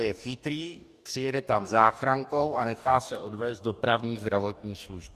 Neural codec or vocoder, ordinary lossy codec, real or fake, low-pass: codec, 44.1 kHz, 2.6 kbps, DAC; Opus, 64 kbps; fake; 14.4 kHz